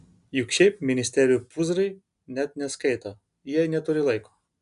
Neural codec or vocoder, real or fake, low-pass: none; real; 10.8 kHz